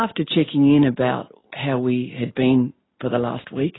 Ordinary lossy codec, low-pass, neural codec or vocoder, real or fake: AAC, 16 kbps; 7.2 kHz; none; real